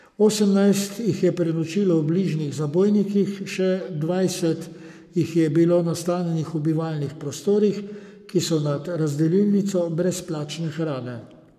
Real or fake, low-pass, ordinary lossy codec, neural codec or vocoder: fake; 14.4 kHz; none; codec, 44.1 kHz, 7.8 kbps, Pupu-Codec